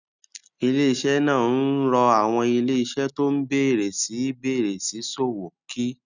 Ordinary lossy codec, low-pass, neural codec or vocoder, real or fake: none; 7.2 kHz; none; real